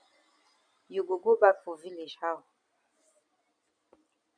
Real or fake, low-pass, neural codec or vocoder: real; 9.9 kHz; none